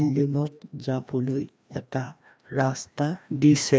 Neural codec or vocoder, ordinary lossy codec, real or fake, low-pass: codec, 16 kHz, 1 kbps, FreqCodec, larger model; none; fake; none